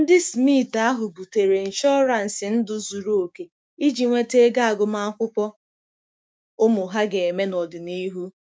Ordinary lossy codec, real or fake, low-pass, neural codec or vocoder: none; fake; none; codec, 16 kHz, 6 kbps, DAC